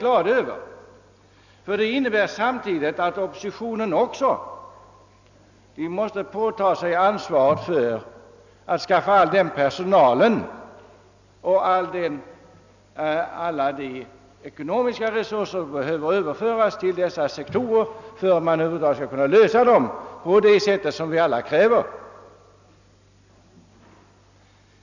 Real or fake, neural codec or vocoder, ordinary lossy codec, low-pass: real; none; none; 7.2 kHz